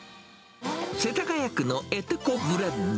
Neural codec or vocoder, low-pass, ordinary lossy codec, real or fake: none; none; none; real